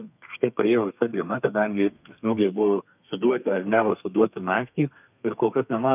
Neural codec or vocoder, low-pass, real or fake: codec, 32 kHz, 1.9 kbps, SNAC; 3.6 kHz; fake